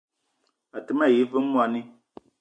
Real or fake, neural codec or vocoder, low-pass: real; none; 9.9 kHz